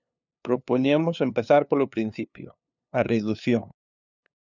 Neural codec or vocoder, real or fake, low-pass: codec, 16 kHz, 2 kbps, FunCodec, trained on LibriTTS, 25 frames a second; fake; 7.2 kHz